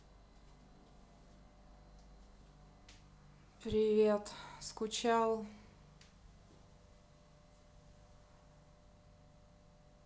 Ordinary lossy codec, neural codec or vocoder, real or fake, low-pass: none; none; real; none